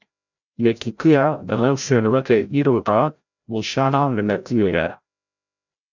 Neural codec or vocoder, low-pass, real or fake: codec, 16 kHz, 0.5 kbps, FreqCodec, larger model; 7.2 kHz; fake